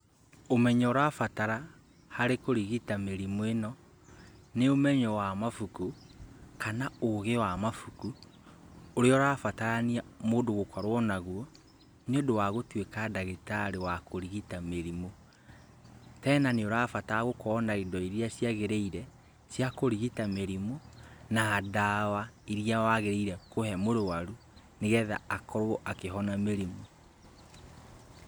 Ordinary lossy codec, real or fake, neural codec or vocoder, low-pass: none; real; none; none